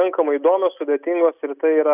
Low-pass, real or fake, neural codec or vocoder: 3.6 kHz; real; none